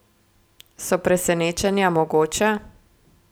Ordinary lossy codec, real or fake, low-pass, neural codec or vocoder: none; real; none; none